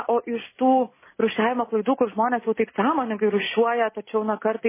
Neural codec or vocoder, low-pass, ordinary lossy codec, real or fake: none; 3.6 kHz; MP3, 16 kbps; real